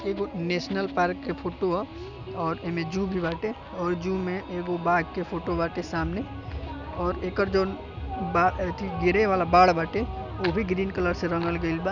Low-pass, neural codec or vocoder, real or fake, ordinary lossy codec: 7.2 kHz; none; real; none